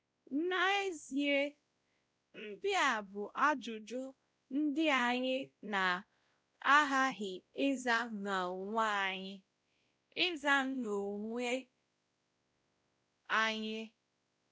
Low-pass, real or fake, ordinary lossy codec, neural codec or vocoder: none; fake; none; codec, 16 kHz, 0.5 kbps, X-Codec, WavLM features, trained on Multilingual LibriSpeech